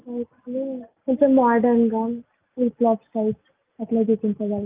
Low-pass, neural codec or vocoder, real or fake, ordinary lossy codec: 3.6 kHz; none; real; Opus, 24 kbps